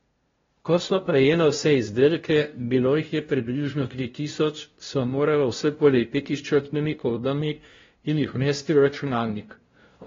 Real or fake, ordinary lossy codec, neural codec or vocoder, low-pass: fake; AAC, 24 kbps; codec, 16 kHz, 0.5 kbps, FunCodec, trained on LibriTTS, 25 frames a second; 7.2 kHz